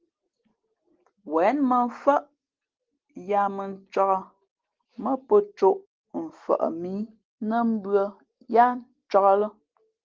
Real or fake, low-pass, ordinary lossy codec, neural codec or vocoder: real; 7.2 kHz; Opus, 16 kbps; none